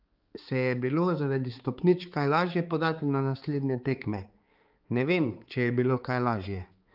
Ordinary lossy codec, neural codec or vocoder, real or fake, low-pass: Opus, 24 kbps; codec, 16 kHz, 4 kbps, X-Codec, HuBERT features, trained on balanced general audio; fake; 5.4 kHz